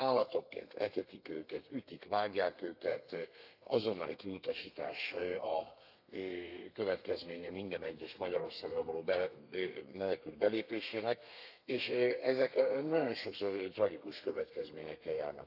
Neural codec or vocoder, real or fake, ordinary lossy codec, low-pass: codec, 32 kHz, 1.9 kbps, SNAC; fake; none; 5.4 kHz